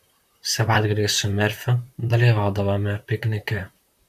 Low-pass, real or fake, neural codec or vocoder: 14.4 kHz; fake; vocoder, 44.1 kHz, 128 mel bands, Pupu-Vocoder